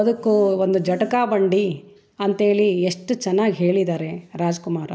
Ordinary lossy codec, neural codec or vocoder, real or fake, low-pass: none; none; real; none